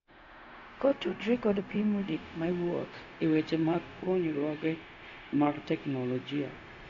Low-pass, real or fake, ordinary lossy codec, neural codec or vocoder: 7.2 kHz; fake; none; codec, 16 kHz, 0.4 kbps, LongCat-Audio-Codec